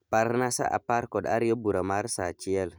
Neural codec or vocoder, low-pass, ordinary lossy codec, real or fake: none; none; none; real